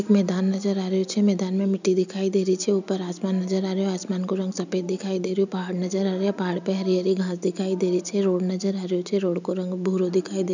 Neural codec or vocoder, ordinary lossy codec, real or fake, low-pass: none; none; real; 7.2 kHz